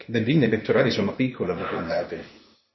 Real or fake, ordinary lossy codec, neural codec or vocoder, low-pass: fake; MP3, 24 kbps; codec, 16 kHz, 0.8 kbps, ZipCodec; 7.2 kHz